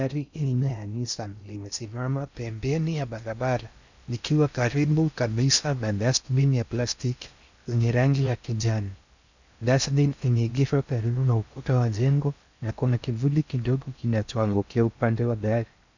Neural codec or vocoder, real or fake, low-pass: codec, 16 kHz in and 24 kHz out, 0.6 kbps, FocalCodec, streaming, 2048 codes; fake; 7.2 kHz